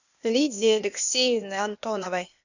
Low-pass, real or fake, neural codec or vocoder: 7.2 kHz; fake; codec, 16 kHz, 0.8 kbps, ZipCodec